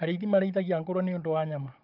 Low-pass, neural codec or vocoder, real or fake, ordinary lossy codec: 5.4 kHz; codec, 16 kHz, 16 kbps, FunCodec, trained on Chinese and English, 50 frames a second; fake; Opus, 32 kbps